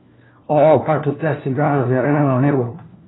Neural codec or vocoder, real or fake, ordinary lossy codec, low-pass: codec, 16 kHz, 1 kbps, FunCodec, trained on LibriTTS, 50 frames a second; fake; AAC, 16 kbps; 7.2 kHz